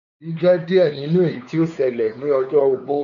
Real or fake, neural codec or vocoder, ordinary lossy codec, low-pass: fake; codec, 16 kHz, 4 kbps, X-Codec, WavLM features, trained on Multilingual LibriSpeech; none; 7.2 kHz